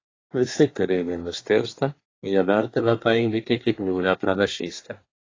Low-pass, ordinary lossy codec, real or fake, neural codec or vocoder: 7.2 kHz; AAC, 32 kbps; fake; codec, 24 kHz, 1 kbps, SNAC